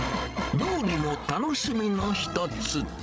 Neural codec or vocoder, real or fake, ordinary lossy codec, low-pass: codec, 16 kHz, 16 kbps, FreqCodec, larger model; fake; none; none